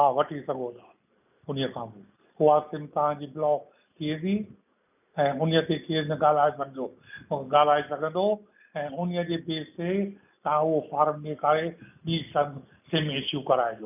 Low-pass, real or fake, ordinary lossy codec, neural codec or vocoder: 3.6 kHz; real; none; none